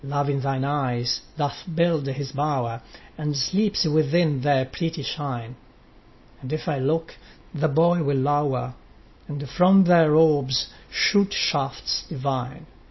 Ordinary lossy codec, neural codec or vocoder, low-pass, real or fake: MP3, 24 kbps; none; 7.2 kHz; real